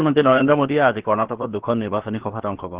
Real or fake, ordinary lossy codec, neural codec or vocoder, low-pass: fake; Opus, 16 kbps; codec, 16 kHz, about 1 kbps, DyCAST, with the encoder's durations; 3.6 kHz